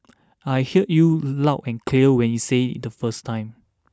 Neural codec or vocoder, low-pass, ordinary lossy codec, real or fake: none; none; none; real